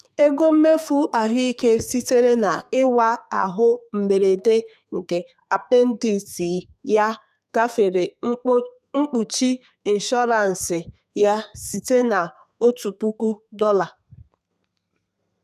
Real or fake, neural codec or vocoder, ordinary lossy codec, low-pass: fake; codec, 32 kHz, 1.9 kbps, SNAC; none; 14.4 kHz